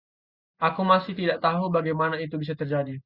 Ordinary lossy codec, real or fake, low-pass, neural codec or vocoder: Opus, 64 kbps; real; 5.4 kHz; none